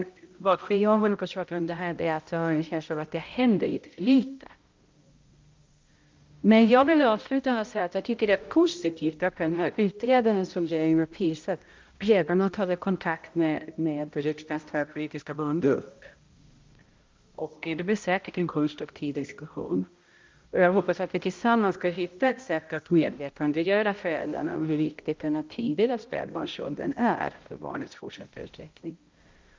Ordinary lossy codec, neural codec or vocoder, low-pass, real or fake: Opus, 24 kbps; codec, 16 kHz, 0.5 kbps, X-Codec, HuBERT features, trained on balanced general audio; 7.2 kHz; fake